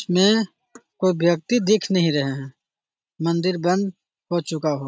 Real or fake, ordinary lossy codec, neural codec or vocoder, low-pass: real; none; none; none